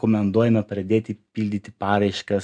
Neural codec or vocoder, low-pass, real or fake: none; 9.9 kHz; real